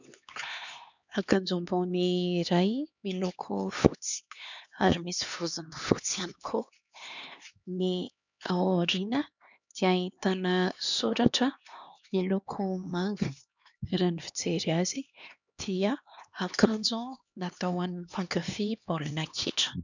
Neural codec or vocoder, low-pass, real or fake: codec, 16 kHz, 2 kbps, X-Codec, HuBERT features, trained on LibriSpeech; 7.2 kHz; fake